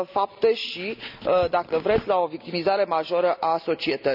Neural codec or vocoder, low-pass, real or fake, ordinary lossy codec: none; 5.4 kHz; real; none